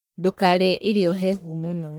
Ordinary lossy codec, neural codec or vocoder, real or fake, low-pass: none; codec, 44.1 kHz, 1.7 kbps, Pupu-Codec; fake; none